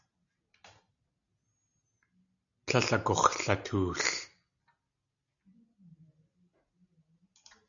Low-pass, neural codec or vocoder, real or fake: 7.2 kHz; none; real